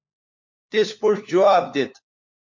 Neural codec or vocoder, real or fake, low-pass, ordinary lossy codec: codec, 16 kHz, 4 kbps, FunCodec, trained on LibriTTS, 50 frames a second; fake; 7.2 kHz; MP3, 48 kbps